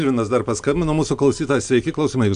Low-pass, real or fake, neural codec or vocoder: 9.9 kHz; real; none